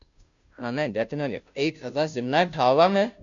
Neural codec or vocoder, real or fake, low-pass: codec, 16 kHz, 0.5 kbps, FunCodec, trained on Chinese and English, 25 frames a second; fake; 7.2 kHz